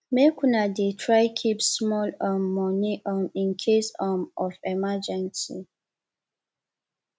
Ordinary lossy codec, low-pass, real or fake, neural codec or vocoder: none; none; real; none